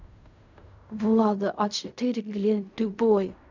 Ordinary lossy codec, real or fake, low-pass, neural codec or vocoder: none; fake; 7.2 kHz; codec, 16 kHz in and 24 kHz out, 0.4 kbps, LongCat-Audio-Codec, fine tuned four codebook decoder